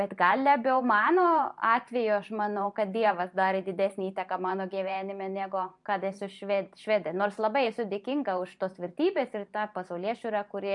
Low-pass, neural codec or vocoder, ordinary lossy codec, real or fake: 10.8 kHz; vocoder, 44.1 kHz, 128 mel bands every 512 samples, BigVGAN v2; MP3, 64 kbps; fake